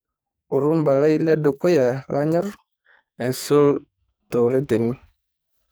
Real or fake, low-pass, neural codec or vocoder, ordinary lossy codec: fake; none; codec, 44.1 kHz, 2.6 kbps, SNAC; none